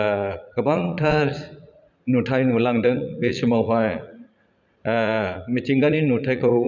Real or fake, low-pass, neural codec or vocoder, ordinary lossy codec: fake; 7.2 kHz; vocoder, 44.1 kHz, 80 mel bands, Vocos; none